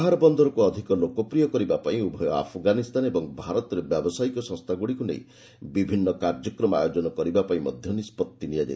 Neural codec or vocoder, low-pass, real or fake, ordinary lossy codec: none; none; real; none